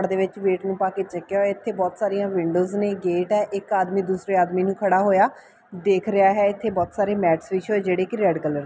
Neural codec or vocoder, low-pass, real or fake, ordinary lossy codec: none; none; real; none